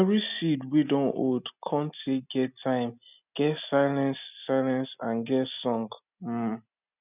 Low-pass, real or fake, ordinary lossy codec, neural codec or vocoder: 3.6 kHz; real; none; none